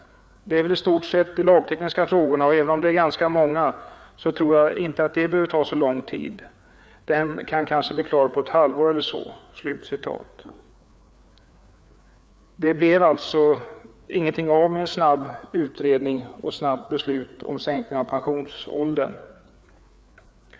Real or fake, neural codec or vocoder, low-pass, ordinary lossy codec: fake; codec, 16 kHz, 4 kbps, FreqCodec, larger model; none; none